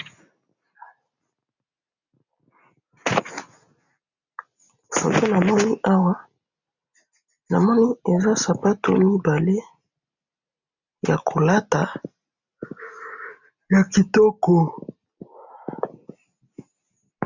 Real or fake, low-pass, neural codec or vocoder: real; 7.2 kHz; none